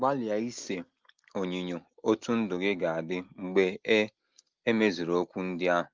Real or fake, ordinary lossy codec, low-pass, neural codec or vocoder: real; Opus, 24 kbps; 7.2 kHz; none